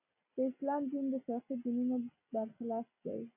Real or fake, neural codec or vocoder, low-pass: real; none; 3.6 kHz